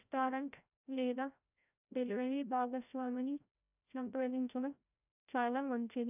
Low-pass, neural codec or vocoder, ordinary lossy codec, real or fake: 3.6 kHz; codec, 16 kHz, 0.5 kbps, FreqCodec, larger model; none; fake